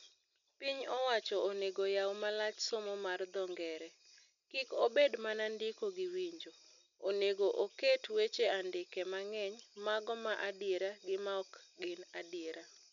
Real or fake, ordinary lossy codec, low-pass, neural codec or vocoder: real; none; 7.2 kHz; none